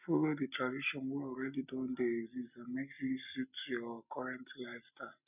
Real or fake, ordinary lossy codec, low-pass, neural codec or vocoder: real; none; 3.6 kHz; none